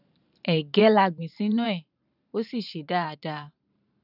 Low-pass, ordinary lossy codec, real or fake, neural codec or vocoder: 5.4 kHz; none; fake; vocoder, 44.1 kHz, 128 mel bands every 512 samples, BigVGAN v2